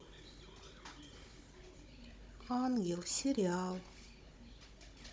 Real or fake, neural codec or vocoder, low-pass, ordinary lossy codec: fake; codec, 16 kHz, 16 kbps, FunCodec, trained on Chinese and English, 50 frames a second; none; none